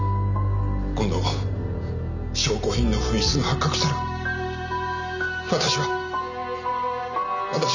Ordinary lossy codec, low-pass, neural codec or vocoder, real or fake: none; 7.2 kHz; none; real